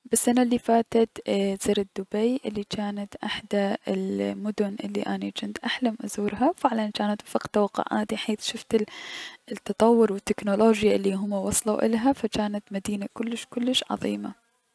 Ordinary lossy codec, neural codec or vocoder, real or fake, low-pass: none; none; real; none